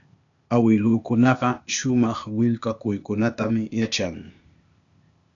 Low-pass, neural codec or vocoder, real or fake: 7.2 kHz; codec, 16 kHz, 0.8 kbps, ZipCodec; fake